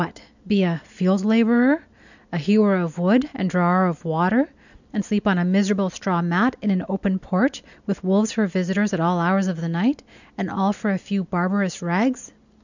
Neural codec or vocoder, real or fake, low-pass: none; real; 7.2 kHz